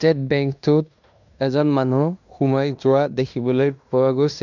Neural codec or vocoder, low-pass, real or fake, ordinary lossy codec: codec, 16 kHz in and 24 kHz out, 0.9 kbps, LongCat-Audio-Codec, fine tuned four codebook decoder; 7.2 kHz; fake; none